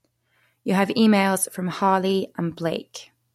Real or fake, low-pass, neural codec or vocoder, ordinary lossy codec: real; 19.8 kHz; none; MP3, 64 kbps